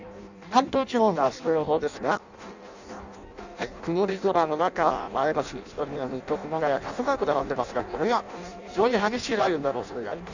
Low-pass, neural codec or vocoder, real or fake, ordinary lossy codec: 7.2 kHz; codec, 16 kHz in and 24 kHz out, 0.6 kbps, FireRedTTS-2 codec; fake; none